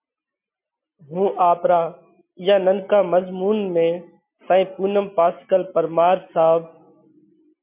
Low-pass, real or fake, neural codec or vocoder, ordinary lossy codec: 3.6 kHz; real; none; MP3, 32 kbps